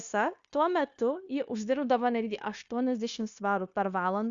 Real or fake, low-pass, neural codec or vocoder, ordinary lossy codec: fake; 7.2 kHz; codec, 16 kHz, 0.9 kbps, LongCat-Audio-Codec; Opus, 64 kbps